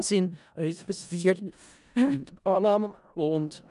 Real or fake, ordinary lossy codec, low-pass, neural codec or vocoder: fake; none; 10.8 kHz; codec, 16 kHz in and 24 kHz out, 0.4 kbps, LongCat-Audio-Codec, four codebook decoder